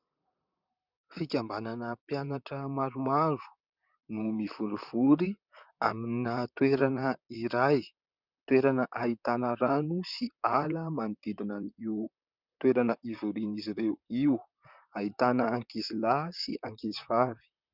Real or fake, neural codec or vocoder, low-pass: fake; vocoder, 44.1 kHz, 128 mel bands, Pupu-Vocoder; 5.4 kHz